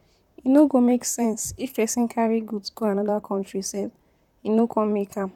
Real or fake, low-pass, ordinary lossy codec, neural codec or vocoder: fake; 19.8 kHz; none; vocoder, 44.1 kHz, 128 mel bands, Pupu-Vocoder